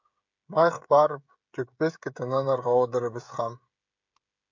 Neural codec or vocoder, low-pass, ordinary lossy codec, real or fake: codec, 16 kHz, 16 kbps, FreqCodec, smaller model; 7.2 kHz; MP3, 64 kbps; fake